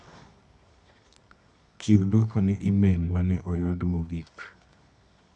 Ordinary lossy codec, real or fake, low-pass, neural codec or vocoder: none; fake; none; codec, 24 kHz, 0.9 kbps, WavTokenizer, medium music audio release